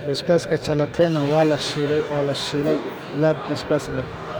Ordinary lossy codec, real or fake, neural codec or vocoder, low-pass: none; fake; codec, 44.1 kHz, 2.6 kbps, DAC; none